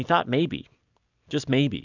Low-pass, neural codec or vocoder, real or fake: 7.2 kHz; none; real